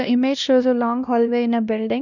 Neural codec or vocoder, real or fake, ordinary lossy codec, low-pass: codec, 16 kHz, 1 kbps, X-Codec, WavLM features, trained on Multilingual LibriSpeech; fake; none; 7.2 kHz